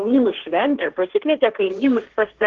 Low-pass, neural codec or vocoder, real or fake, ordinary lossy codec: 7.2 kHz; codec, 16 kHz, 1.1 kbps, Voila-Tokenizer; fake; Opus, 16 kbps